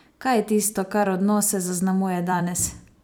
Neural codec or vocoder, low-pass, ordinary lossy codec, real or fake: vocoder, 44.1 kHz, 128 mel bands every 256 samples, BigVGAN v2; none; none; fake